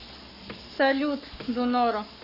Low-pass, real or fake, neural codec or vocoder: 5.4 kHz; fake; codec, 44.1 kHz, 7.8 kbps, Pupu-Codec